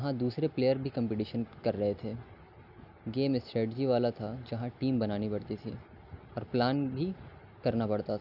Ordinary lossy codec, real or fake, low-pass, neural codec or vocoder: none; real; 5.4 kHz; none